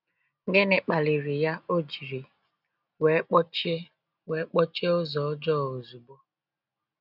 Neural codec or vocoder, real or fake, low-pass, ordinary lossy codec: none; real; 5.4 kHz; none